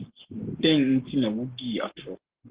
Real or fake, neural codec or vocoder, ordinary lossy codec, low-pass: fake; codec, 44.1 kHz, 7.8 kbps, Pupu-Codec; Opus, 16 kbps; 3.6 kHz